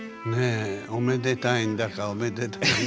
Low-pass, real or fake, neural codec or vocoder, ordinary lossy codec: none; real; none; none